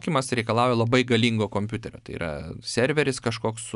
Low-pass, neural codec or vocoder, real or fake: 10.8 kHz; none; real